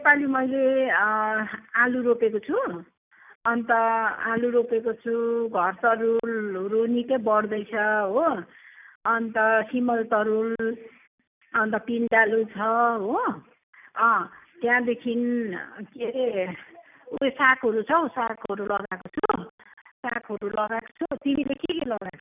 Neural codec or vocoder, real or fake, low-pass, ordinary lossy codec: none; real; 3.6 kHz; none